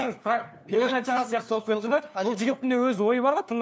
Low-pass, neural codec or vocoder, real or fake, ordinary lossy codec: none; codec, 16 kHz, 2 kbps, FunCodec, trained on LibriTTS, 25 frames a second; fake; none